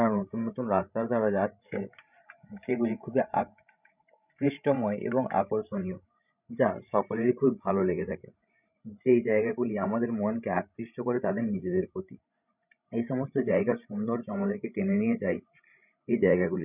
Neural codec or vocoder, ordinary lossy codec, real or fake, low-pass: codec, 16 kHz, 16 kbps, FreqCodec, larger model; none; fake; 3.6 kHz